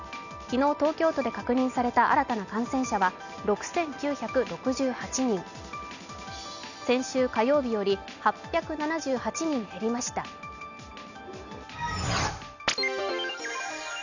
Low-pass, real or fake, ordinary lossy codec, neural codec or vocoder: 7.2 kHz; real; none; none